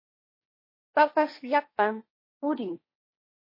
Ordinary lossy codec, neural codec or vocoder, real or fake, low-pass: MP3, 24 kbps; codec, 16 kHz, 1.1 kbps, Voila-Tokenizer; fake; 5.4 kHz